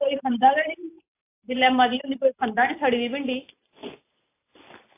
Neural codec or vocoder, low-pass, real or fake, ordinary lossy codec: none; 3.6 kHz; real; AAC, 24 kbps